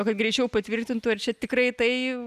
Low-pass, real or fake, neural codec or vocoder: 14.4 kHz; real; none